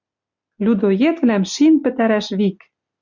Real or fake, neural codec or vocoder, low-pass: real; none; 7.2 kHz